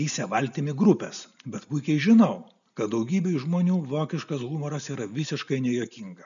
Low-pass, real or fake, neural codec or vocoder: 7.2 kHz; real; none